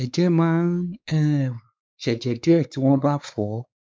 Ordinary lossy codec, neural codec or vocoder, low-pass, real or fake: none; codec, 16 kHz, 4 kbps, X-Codec, WavLM features, trained on Multilingual LibriSpeech; none; fake